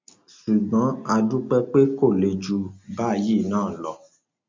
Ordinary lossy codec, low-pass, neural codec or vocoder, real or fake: MP3, 48 kbps; 7.2 kHz; none; real